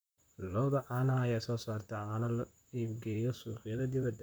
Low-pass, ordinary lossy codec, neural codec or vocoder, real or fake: none; none; vocoder, 44.1 kHz, 128 mel bands, Pupu-Vocoder; fake